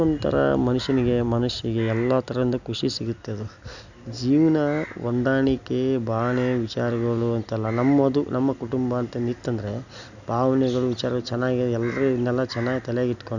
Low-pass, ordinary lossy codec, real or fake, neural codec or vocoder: 7.2 kHz; none; real; none